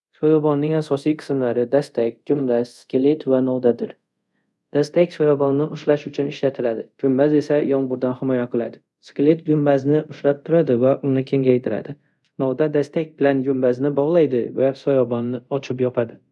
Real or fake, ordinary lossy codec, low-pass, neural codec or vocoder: fake; none; none; codec, 24 kHz, 0.5 kbps, DualCodec